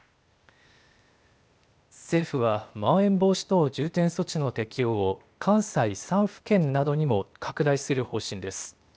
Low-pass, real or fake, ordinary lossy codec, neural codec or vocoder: none; fake; none; codec, 16 kHz, 0.8 kbps, ZipCodec